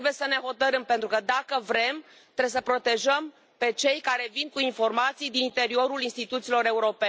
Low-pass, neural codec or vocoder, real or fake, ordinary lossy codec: none; none; real; none